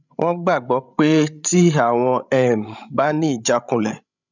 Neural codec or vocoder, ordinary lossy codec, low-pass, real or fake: codec, 16 kHz, 16 kbps, FreqCodec, larger model; none; 7.2 kHz; fake